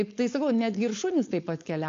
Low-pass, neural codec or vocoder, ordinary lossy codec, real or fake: 7.2 kHz; codec, 16 kHz, 4.8 kbps, FACodec; AAC, 48 kbps; fake